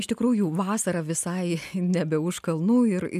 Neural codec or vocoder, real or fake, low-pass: none; real; 14.4 kHz